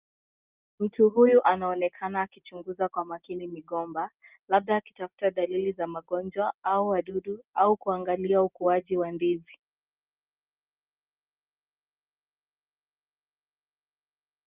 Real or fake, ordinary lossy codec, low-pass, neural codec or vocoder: real; Opus, 24 kbps; 3.6 kHz; none